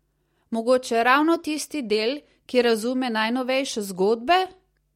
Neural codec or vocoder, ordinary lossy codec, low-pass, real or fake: none; MP3, 64 kbps; 19.8 kHz; real